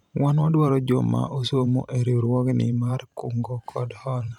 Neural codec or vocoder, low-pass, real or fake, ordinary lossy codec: vocoder, 44.1 kHz, 128 mel bands every 512 samples, BigVGAN v2; 19.8 kHz; fake; none